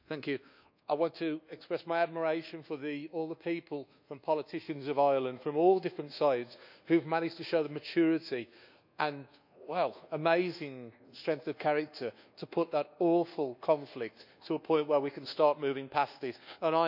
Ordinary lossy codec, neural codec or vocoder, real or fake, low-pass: none; codec, 24 kHz, 1.2 kbps, DualCodec; fake; 5.4 kHz